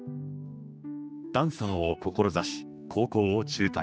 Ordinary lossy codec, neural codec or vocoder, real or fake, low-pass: none; codec, 16 kHz, 2 kbps, X-Codec, HuBERT features, trained on general audio; fake; none